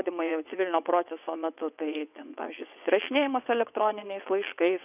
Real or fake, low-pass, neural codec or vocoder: fake; 3.6 kHz; vocoder, 22.05 kHz, 80 mel bands, WaveNeXt